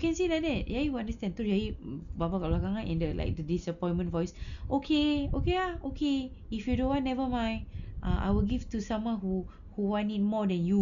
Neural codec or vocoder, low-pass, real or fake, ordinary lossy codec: none; 7.2 kHz; real; none